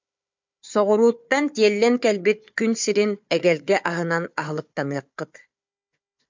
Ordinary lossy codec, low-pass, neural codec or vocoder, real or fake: MP3, 64 kbps; 7.2 kHz; codec, 16 kHz, 4 kbps, FunCodec, trained on Chinese and English, 50 frames a second; fake